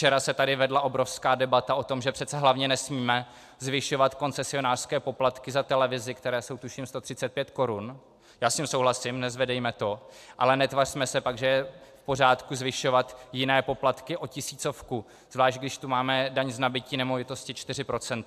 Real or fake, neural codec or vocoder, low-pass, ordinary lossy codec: real; none; 14.4 kHz; AAC, 96 kbps